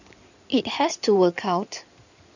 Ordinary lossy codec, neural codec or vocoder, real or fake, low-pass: none; codec, 16 kHz in and 24 kHz out, 2.2 kbps, FireRedTTS-2 codec; fake; 7.2 kHz